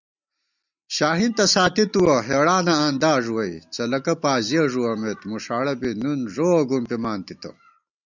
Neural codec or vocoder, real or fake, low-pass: none; real; 7.2 kHz